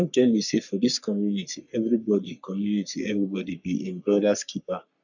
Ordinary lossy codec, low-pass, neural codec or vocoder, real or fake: none; 7.2 kHz; codec, 44.1 kHz, 3.4 kbps, Pupu-Codec; fake